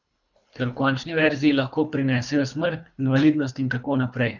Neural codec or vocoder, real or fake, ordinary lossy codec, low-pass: codec, 24 kHz, 3 kbps, HILCodec; fake; none; 7.2 kHz